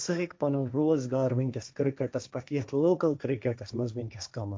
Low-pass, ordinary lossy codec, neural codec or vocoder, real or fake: none; none; codec, 16 kHz, 1.1 kbps, Voila-Tokenizer; fake